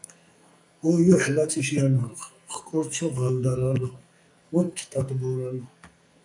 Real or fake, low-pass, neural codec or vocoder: fake; 10.8 kHz; codec, 44.1 kHz, 2.6 kbps, SNAC